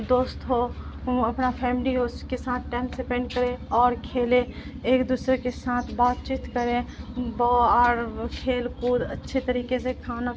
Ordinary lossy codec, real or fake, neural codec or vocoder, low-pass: none; real; none; none